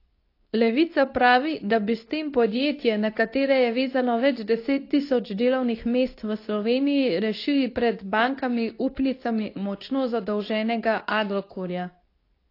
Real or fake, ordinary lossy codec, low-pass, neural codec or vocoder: fake; AAC, 32 kbps; 5.4 kHz; codec, 24 kHz, 0.9 kbps, WavTokenizer, medium speech release version 2